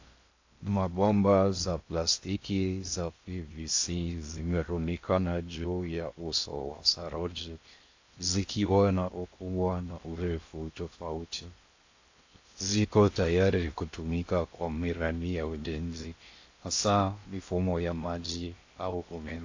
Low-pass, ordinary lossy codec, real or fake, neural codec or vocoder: 7.2 kHz; AAC, 48 kbps; fake; codec, 16 kHz in and 24 kHz out, 0.6 kbps, FocalCodec, streaming, 2048 codes